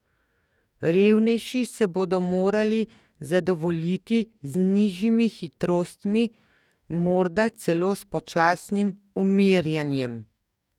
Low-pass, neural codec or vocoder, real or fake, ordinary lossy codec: 19.8 kHz; codec, 44.1 kHz, 2.6 kbps, DAC; fake; none